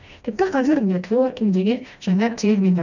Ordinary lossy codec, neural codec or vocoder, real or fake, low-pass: none; codec, 16 kHz, 1 kbps, FreqCodec, smaller model; fake; 7.2 kHz